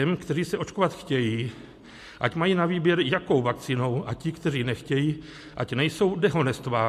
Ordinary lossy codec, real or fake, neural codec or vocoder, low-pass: MP3, 64 kbps; real; none; 14.4 kHz